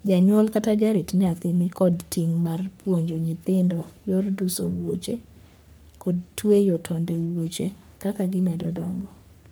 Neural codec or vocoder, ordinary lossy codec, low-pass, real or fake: codec, 44.1 kHz, 3.4 kbps, Pupu-Codec; none; none; fake